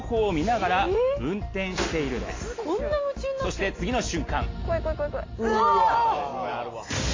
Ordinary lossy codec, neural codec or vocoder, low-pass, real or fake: AAC, 32 kbps; none; 7.2 kHz; real